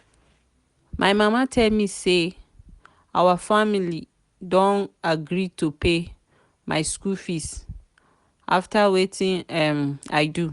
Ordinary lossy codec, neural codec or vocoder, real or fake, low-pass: Opus, 64 kbps; none; real; 10.8 kHz